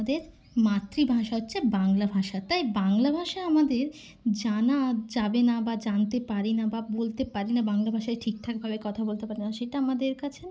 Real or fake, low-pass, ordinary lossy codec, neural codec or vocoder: real; none; none; none